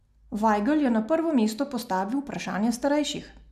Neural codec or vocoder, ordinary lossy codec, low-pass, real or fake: none; none; 14.4 kHz; real